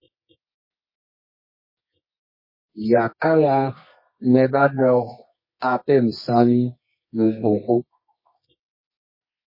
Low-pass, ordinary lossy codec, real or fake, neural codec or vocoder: 5.4 kHz; MP3, 24 kbps; fake; codec, 24 kHz, 0.9 kbps, WavTokenizer, medium music audio release